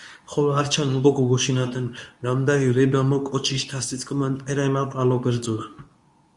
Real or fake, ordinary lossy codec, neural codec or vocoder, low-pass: fake; Opus, 64 kbps; codec, 24 kHz, 0.9 kbps, WavTokenizer, medium speech release version 2; 10.8 kHz